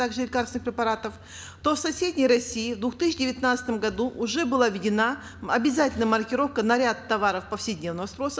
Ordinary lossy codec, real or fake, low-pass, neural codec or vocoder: none; real; none; none